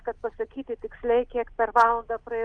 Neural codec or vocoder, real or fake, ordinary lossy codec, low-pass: none; real; Opus, 32 kbps; 9.9 kHz